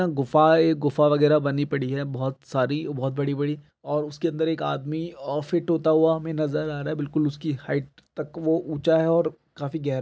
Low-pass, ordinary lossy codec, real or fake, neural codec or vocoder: none; none; real; none